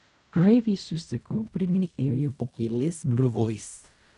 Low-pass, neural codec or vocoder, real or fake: 10.8 kHz; codec, 16 kHz in and 24 kHz out, 0.4 kbps, LongCat-Audio-Codec, fine tuned four codebook decoder; fake